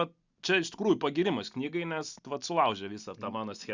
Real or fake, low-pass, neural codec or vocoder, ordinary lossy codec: real; 7.2 kHz; none; Opus, 64 kbps